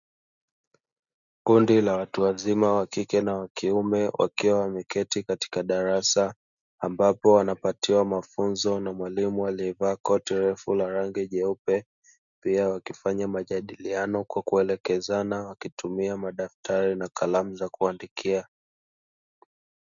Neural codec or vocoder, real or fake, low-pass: none; real; 7.2 kHz